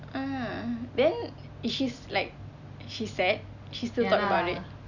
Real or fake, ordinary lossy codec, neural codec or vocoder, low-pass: real; none; none; 7.2 kHz